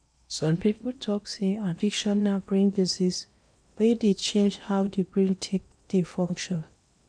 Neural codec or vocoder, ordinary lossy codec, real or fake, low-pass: codec, 16 kHz in and 24 kHz out, 0.8 kbps, FocalCodec, streaming, 65536 codes; none; fake; 9.9 kHz